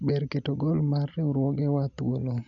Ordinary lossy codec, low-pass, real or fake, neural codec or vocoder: none; 7.2 kHz; real; none